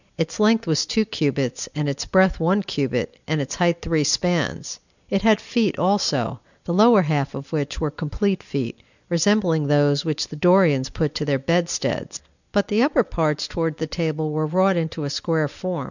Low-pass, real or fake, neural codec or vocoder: 7.2 kHz; real; none